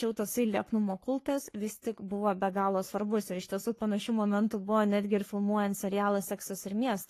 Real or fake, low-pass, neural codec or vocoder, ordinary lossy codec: fake; 14.4 kHz; codec, 44.1 kHz, 3.4 kbps, Pupu-Codec; AAC, 48 kbps